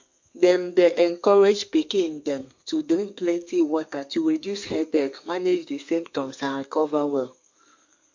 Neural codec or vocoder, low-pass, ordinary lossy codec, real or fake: codec, 32 kHz, 1.9 kbps, SNAC; 7.2 kHz; MP3, 48 kbps; fake